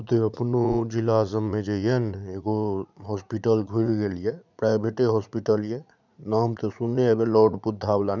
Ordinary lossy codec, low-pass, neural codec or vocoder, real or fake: none; 7.2 kHz; vocoder, 44.1 kHz, 80 mel bands, Vocos; fake